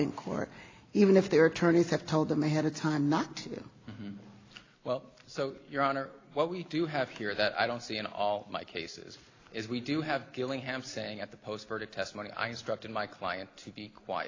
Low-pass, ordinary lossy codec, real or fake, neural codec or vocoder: 7.2 kHz; AAC, 32 kbps; real; none